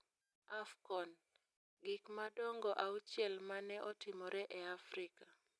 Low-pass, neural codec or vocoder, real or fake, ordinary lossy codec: none; none; real; none